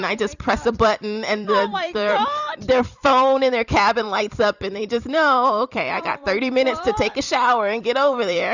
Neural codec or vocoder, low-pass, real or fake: vocoder, 44.1 kHz, 128 mel bands every 256 samples, BigVGAN v2; 7.2 kHz; fake